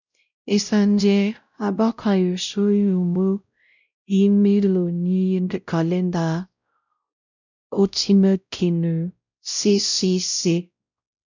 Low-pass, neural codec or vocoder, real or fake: 7.2 kHz; codec, 16 kHz, 0.5 kbps, X-Codec, WavLM features, trained on Multilingual LibriSpeech; fake